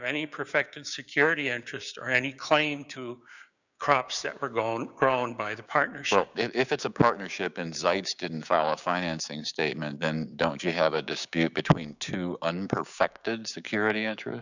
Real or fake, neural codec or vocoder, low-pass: fake; codec, 16 kHz, 6 kbps, DAC; 7.2 kHz